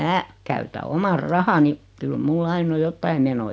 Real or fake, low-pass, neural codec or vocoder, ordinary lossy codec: real; none; none; none